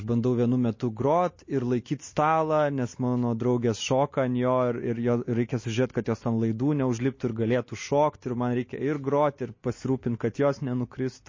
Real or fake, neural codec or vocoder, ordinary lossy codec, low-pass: real; none; MP3, 32 kbps; 7.2 kHz